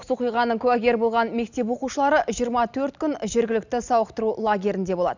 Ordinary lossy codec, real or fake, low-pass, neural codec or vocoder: none; real; 7.2 kHz; none